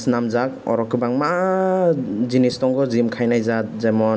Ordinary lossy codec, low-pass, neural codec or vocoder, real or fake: none; none; none; real